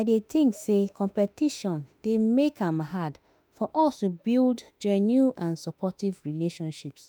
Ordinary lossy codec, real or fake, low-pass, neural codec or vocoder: none; fake; none; autoencoder, 48 kHz, 32 numbers a frame, DAC-VAE, trained on Japanese speech